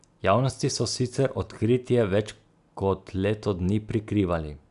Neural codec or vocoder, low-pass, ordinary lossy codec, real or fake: none; 10.8 kHz; none; real